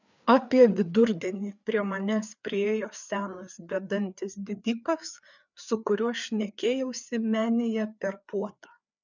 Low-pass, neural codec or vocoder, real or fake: 7.2 kHz; codec, 16 kHz, 4 kbps, FreqCodec, larger model; fake